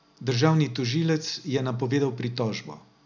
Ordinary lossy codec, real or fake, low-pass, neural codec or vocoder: none; real; 7.2 kHz; none